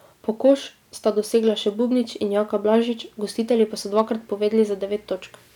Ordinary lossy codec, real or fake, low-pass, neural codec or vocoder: none; real; 19.8 kHz; none